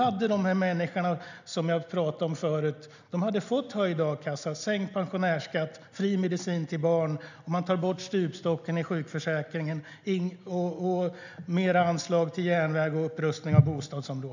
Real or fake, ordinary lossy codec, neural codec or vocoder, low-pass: real; none; none; 7.2 kHz